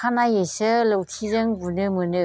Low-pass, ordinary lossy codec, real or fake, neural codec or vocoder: none; none; real; none